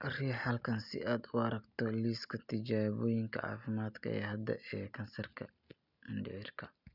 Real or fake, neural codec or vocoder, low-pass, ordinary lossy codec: real; none; 5.4 kHz; none